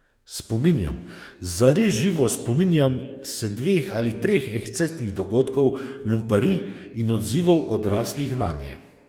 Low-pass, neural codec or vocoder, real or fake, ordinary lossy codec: 19.8 kHz; codec, 44.1 kHz, 2.6 kbps, DAC; fake; none